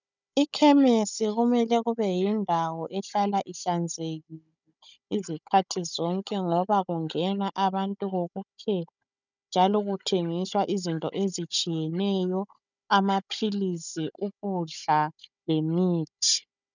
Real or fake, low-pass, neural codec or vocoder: fake; 7.2 kHz; codec, 16 kHz, 16 kbps, FunCodec, trained on Chinese and English, 50 frames a second